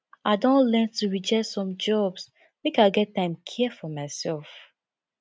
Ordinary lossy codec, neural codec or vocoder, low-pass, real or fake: none; none; none; real